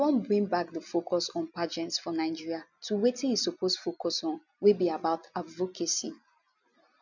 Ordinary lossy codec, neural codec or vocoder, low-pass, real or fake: none; none; 7.2 kHz; real